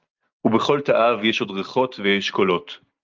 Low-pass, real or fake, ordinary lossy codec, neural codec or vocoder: 7.2 kHz; real; Opus, 32 kbps; none